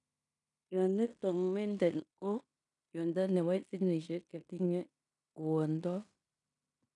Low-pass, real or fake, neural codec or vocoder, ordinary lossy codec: 10.8 kHz; fake; codec, 16 kHz in and 24 kHz out, 0.9 kbps, LongCat-Audio-Codec, fine tuned four codebook decoder; none